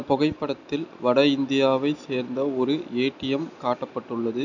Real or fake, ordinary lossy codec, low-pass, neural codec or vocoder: real; none; 7.2 kHz; none